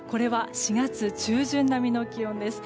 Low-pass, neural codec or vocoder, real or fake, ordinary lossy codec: none; none; real; none